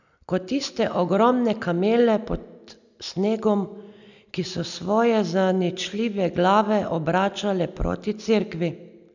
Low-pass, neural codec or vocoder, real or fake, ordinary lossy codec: 7.2 kHz; none; real; none